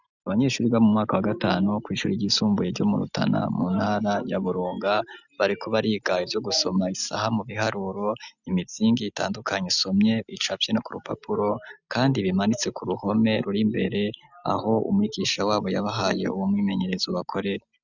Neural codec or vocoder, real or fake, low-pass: none; real; 7.2 kHz